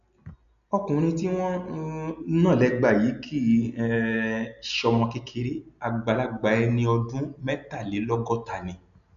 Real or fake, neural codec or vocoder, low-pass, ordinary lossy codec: real; none; 7.2 kHz; none